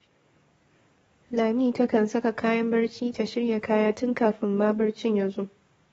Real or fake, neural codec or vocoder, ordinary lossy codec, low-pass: fake; codec, 44.1 kHz, 7.8 kbps, DAC; AAC, 24 kbps; 19.8 kHz